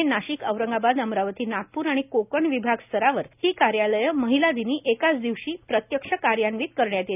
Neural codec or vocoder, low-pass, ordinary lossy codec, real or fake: none; 3.6 kHz; none; real